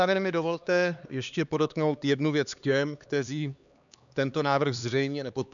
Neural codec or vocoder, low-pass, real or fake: codec, 16 kHz, 2 kbps, X-Codec, HuBERT features, trained on LibriSpeech; 7.2 kHz; fake